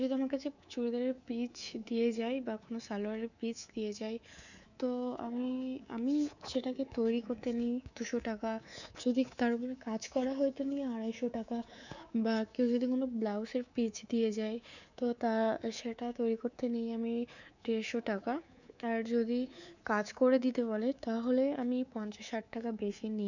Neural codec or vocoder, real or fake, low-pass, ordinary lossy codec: codec, 24 kHz, 3.1 kbps, DualCodec; fake; 7.2 kHz; none